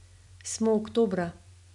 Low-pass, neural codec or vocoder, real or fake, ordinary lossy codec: 10.8 kHz; none; real; none